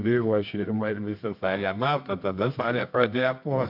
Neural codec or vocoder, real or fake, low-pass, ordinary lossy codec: codec, 24 kHz, 0.9 kbps, WavTokenizer, medium music audio release; fake; 5.4 kHz; MP3, 48 kbps